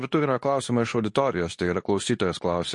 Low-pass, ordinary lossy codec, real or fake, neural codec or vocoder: 10.8 kHz; MP3, 48 kbps; fake; codec, 24 kHz, 0.9 kbps, WavTokenizer, medium speech release version 2